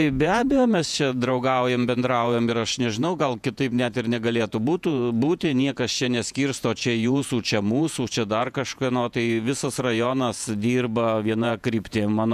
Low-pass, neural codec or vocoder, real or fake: 14.4 kHz; vocoder, 48 kHz, 128 mel bands, Vocos; fake